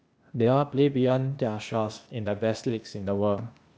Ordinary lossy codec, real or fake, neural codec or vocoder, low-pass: none; fake; codec, 16 kHz, 0.8 kbps, ZipCodec; none